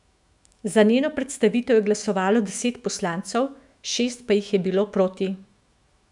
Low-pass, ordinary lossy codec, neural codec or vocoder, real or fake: 10.8 kHz; none; autoencoder, 48 kHz, 128 numbers a frame, DAC-VAE, trained on Japanese speech; fake